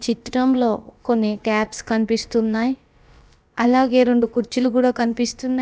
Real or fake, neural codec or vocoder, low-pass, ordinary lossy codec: fake; codec, 16 kHz, about 1 kbps, DyCAST, with the encoder's durations; none; none